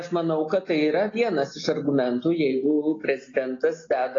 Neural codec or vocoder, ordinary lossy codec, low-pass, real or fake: none; AAC, 32 kbps; 7.2 kHz; real